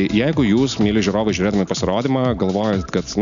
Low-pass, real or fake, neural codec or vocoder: 7.2 kHz; real; none